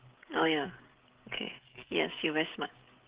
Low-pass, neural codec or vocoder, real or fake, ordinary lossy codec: 3.6 kHz; codec, 16 kHz, 16 kbps, FreqCodec, smaller model; fake; Opus, 16 kbps